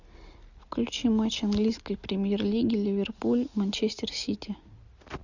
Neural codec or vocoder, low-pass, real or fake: none; 7.2 kHz; real